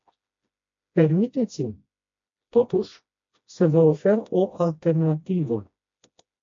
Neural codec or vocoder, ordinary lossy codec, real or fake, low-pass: codec, 16 kHz, 1 kbps, FreqCodec, smaller model; MP3, 48 kbps; fake; 7.2 kHz